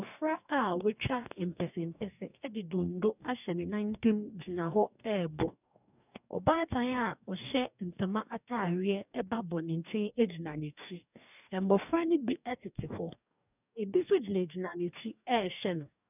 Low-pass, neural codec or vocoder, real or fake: 3.6 kHz; codec, 44.1 kHz, 2.6 kbps, DAC; fake